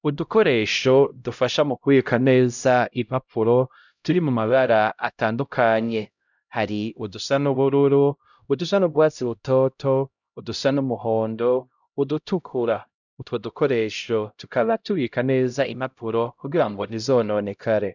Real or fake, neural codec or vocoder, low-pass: fake; codec, 16 kHz, 0.5 kbps, X-Codec, HuBERT features, trained on LibriSpeech; 7.2 kHz